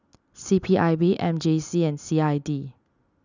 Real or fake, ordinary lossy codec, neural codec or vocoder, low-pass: real; none; none; 7.2 kHz